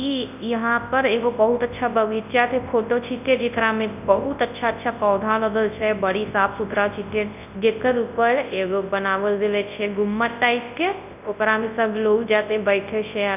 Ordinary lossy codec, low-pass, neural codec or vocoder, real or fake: none; 3.6 kHz; codec, 24 kHz, 0.9 kbps, WavTokenizer, large speech release; fake